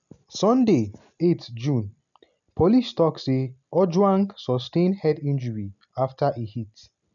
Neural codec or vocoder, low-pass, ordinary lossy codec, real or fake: none; 7.2 kHz; none; real